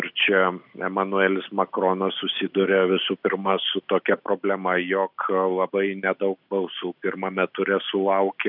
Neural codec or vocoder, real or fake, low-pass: none; real; 5.4 kHz